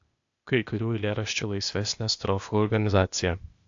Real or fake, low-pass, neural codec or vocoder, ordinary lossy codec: fake; 7.2 kHz; codec, 16 kHz, 0.8 kbps, ZipCodec; AAC, 64 kbps